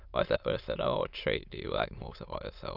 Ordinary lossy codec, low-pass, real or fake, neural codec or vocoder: none; 5.4 kHz; fake; autoencoder, 22.05 kHz, a latent of 192 numbers a frame, VITS, trained on many speakers